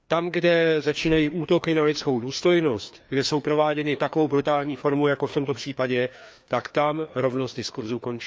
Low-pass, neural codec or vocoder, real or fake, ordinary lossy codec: none; codec, 16 kHz, 2 kbps, FreqCodec, larger model; fake; none